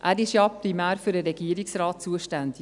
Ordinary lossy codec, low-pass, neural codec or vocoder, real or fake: none; 10.8 kHz; none; real